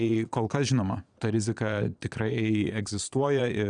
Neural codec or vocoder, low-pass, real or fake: vocoder, 22.05 kHz, 80 mel bands, Vocos; 9.9 kHz; fake